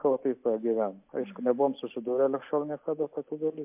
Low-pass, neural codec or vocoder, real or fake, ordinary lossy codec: 3.6 kHz; none; real; MP3, 32 kbps